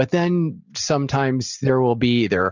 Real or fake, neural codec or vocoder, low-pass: real; none; 7.2 kHz